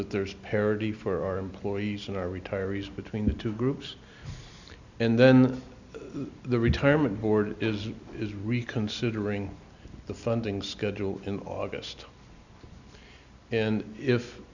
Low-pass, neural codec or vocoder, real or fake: 7.2 kHz; none; real